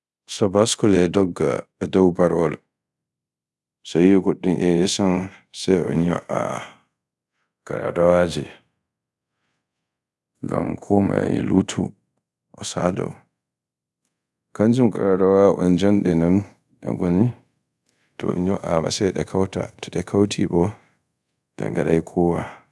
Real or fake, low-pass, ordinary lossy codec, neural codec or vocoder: fake; none; none; codec, 24 kHz, 0.5 kbps, DualCodec